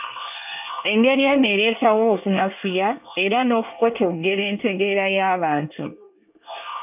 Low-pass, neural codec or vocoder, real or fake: 3.6 kHz; codec, 24 kHz, 1 kbps, SNAC; fake